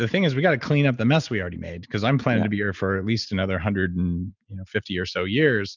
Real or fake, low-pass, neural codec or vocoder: real; 7.2 kHz; none